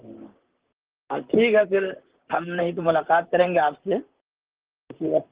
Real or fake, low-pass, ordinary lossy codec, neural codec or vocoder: fake; 3.6 kHz; Opus, 16 kbps; vocoder, 44.1 kHz, 128 mel bands, Pupu-Vocoder